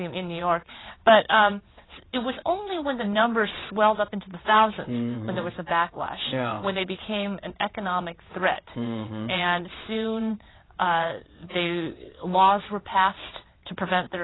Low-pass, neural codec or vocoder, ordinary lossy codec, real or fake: 7.2 kHz; vocoder, 22.05 kHz, 80 mel bands, WaveNeXt; AAC, 16 kbps; fake